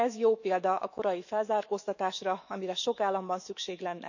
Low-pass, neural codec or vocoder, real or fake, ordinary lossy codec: 7.2 kHz; autoencoder, 48 kHz, 128 numbers a frame, DAC-VAE, trained on Japanese speech; fake; none